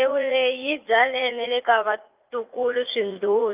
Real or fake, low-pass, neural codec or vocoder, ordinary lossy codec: fake; 3.6 kHz; vocoder, 44.1 kHz, 80 mel bands, Vocos; Opus, 24 kbps